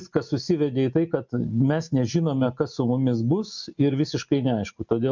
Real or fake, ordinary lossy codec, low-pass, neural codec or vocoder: real; MP3, 64 kbps; 7.2 kHz; none